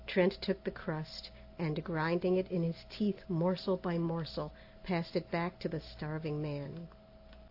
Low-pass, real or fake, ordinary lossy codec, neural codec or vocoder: 5.4 kHz; real; AAC, 32 kbps; none